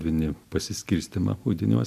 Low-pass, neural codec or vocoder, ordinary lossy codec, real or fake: 14.4 kHz; none; MP3, 96 kbps; real